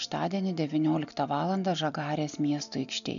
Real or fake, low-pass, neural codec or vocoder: real; 7.2 kHz; none